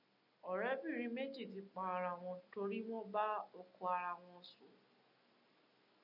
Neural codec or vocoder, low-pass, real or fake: none; 5.4 kHz; real